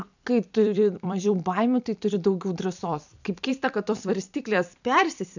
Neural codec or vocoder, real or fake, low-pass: vocoder, 44.1 kHz, 128 mel bands every 256 samples, BigVGAN v2; fake; 7.2 kHz